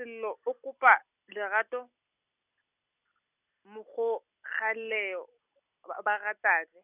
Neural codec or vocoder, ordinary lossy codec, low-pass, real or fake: none; none; 3.6 kHz; real